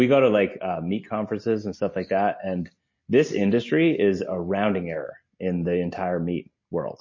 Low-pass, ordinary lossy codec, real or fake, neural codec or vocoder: 7.2 kHz; MP3, 32 kbps; real; none